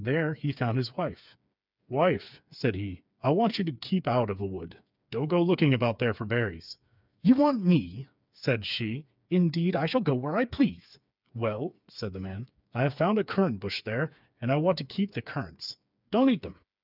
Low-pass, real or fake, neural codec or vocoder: 5.4 kHz; fake; codec, 16 kHz, 4 kbps, FreqCodec, smaller model